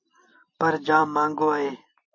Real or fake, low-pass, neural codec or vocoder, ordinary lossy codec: fake; 7.2 kHz; vocoder, 44.1 kHz, 128 mel bands every 256 samples, BigVGAN v2; MP3, 32 kbps